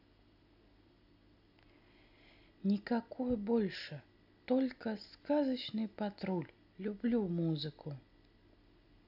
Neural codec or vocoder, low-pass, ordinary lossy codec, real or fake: none; 5.4 kHz; none; real